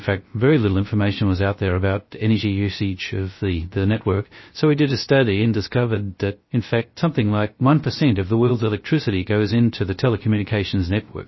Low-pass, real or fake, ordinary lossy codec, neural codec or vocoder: 7.2 kHz; fake; MP3, 24 kbps; codec, 16 kHz, 0.3 kbps, FocalCodec